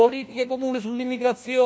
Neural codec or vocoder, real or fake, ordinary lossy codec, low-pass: codec, 16 kHz, 1 kbps, FunCodec, trained on LibriTTS, 50 frames a second; fake; none; none